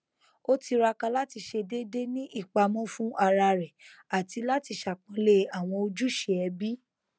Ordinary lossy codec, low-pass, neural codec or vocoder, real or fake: none; none; none; real